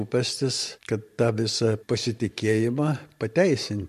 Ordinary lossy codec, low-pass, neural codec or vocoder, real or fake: MP3, 64 kbps; 14.4 kHz; vocoder, 44.1 kHz, 128 mel bands every 512 samples, BigVGAN v2; fake